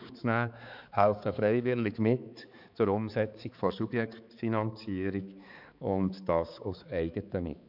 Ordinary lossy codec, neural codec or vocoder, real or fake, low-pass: none; codec, 16 kHz, 4 kbps, X-Codec, HuBERT features, trained on balanced general audio; fake; 5.4 kHz